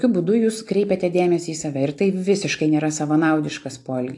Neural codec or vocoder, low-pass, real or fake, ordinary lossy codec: none; 10.8 kHz; real; AAC, 64 kbps